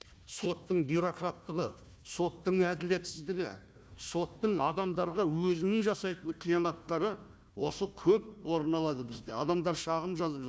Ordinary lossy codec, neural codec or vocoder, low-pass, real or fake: none; codec, 16 kHz, 1 kbps, FunCodec, trained on Chinese and English, 50 frames a second; none; fake